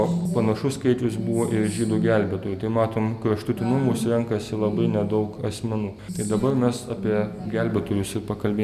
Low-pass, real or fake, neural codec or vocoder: 14.4 kHz; real; none